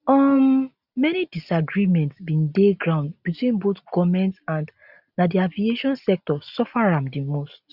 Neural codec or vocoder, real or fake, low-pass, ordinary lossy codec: none; real; 5.4 kHz; none